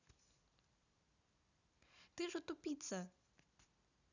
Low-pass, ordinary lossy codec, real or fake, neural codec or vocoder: 7.2 kHz; none; real; none